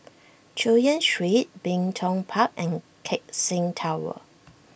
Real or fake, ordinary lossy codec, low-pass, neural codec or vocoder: real; none; none; none